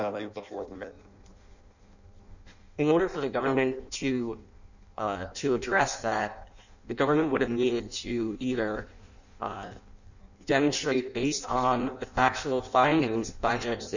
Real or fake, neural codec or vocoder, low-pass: fake; codec, 16 kHz in and 24 kHz out, 0.6 kbps, FireRedTTS-2 codec; 7.2 kHz